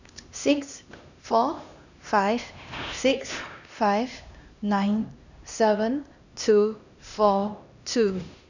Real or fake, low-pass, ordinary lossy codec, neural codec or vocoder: fake; 7.2 kHz; none; codec, 16 kHz, 1 kbps, X-Codec, HuBERT features, trained on LibriSpeech